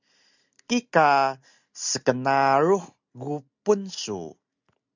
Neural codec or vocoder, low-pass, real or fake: none; 7.2 kHz; real